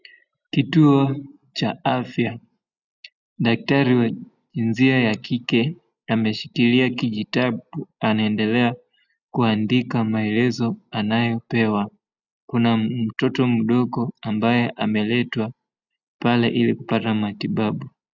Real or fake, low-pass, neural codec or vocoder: real; 7.2 kHz; none